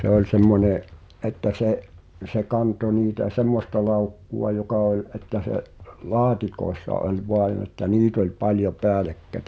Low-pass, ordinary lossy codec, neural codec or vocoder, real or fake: none; none; none; real